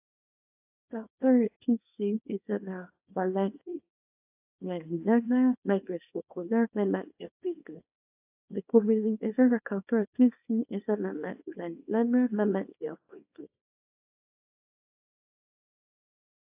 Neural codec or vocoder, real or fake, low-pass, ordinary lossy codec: codec, 24 kHz, 0.9 kbps, WavTokenizer, small release; fake; 3.6 kHz; AAC, 32 kbps